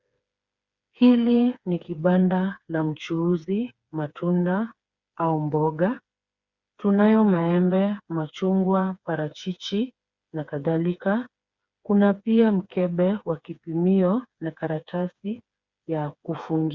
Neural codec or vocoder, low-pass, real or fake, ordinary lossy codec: codec, 16 kHz, 4 kbps, FreqCodec, smaller model; 7.2 kHz; fake; Opus, 64 kbps